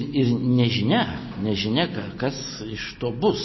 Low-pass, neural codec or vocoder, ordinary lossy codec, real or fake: 7.2 kHz; none; MP3, 24 kbps; real